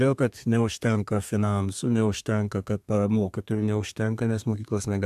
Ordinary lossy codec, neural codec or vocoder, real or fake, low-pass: AAC, 96 kbps; codec, 32 kHz, 1.9 kbps, SNAC; fake; 14.4 kHz